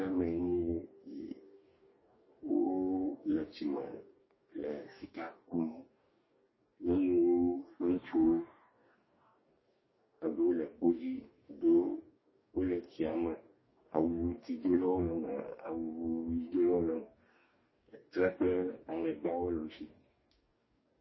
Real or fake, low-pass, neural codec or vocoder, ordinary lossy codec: fake; 7.2 kHz; codec, 44.1 kHz, 2.6 kbps, DAC; MP3, 24 kbps